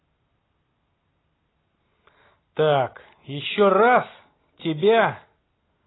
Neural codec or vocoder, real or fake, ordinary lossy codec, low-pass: none; real; AAC, 16 kbps; 7.2 kHz